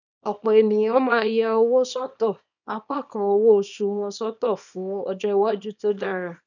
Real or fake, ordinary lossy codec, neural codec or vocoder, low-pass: fake; none; codec, 24 kHz, 0.9 kbps, WavTokenizer, small release; 7.2 kHz